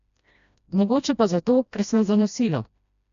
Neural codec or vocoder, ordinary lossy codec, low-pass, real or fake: codec, 16 kHz, 1 kbps, FreqCodec, smaller model; none; 7.2 kHz; fake